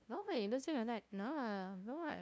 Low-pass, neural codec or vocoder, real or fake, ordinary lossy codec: none; codec, 16 kHz, 0.5 kbps, FunCodec, trained on LibriTTS, 25 frames a second; fake; none